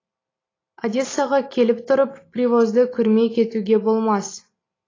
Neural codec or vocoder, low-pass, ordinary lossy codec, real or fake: none; 7.2 kHz; AAC, 32 kbps; real